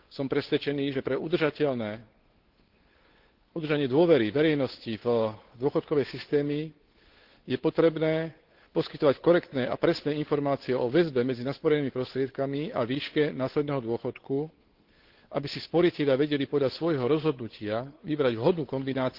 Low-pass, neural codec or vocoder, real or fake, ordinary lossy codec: 5.4 kHz; codec, 16 kHz, 8 kbps, FunCodec, trained on Chinese and English, 25 frames a second; fake; Opus, 16 kbps